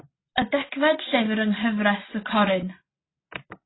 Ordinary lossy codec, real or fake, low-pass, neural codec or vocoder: AAC, 16 kbps; real; 7.2 kHz; none